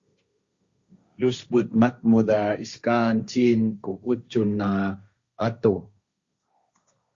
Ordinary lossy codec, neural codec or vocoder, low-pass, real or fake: Opus, 64 kbps; codec, 16 kHz, 1.1 kbps, Voila-Tokenizer; 7.2 kHz; fake